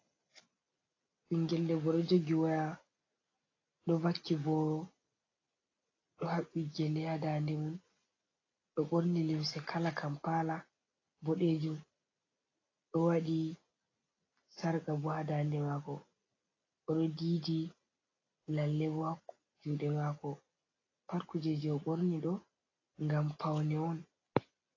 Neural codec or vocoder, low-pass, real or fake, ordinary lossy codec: none; 7.2 kHz; real; AAC, 32 kbps